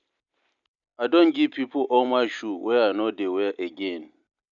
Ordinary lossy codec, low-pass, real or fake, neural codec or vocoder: none; 7.2 kHz; real; none